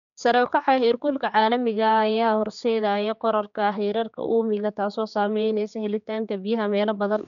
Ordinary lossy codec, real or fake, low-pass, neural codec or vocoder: none; fake; 7.2 kHz; codec, 16 kHz, 4 kbps, X-Codec, HuBERT features, trained on general audio